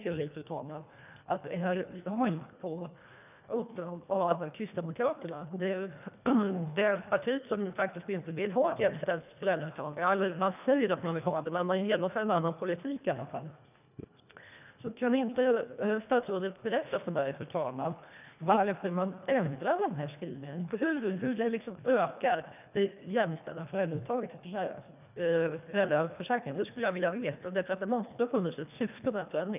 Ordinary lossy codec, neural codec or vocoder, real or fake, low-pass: none; codec, 24 kHz, 1.5 kbps, HILCodec; fake; 3.6 kHz